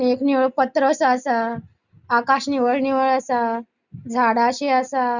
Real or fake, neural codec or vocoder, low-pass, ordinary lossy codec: real; none; 7.2 kHz; Opus, 64 kbps